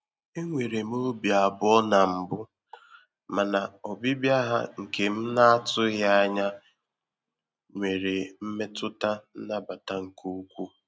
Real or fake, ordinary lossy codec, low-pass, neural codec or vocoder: real; none; none; none